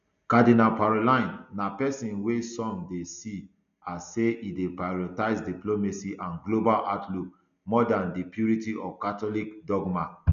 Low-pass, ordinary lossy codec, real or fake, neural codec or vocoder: 7.2 kHz; none; real; none